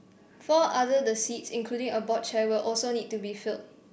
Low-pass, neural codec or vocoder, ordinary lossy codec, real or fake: none; none; none; real